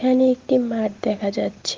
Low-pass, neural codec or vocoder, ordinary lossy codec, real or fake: 7.2 kHz; none; Opus, 16 kbps; real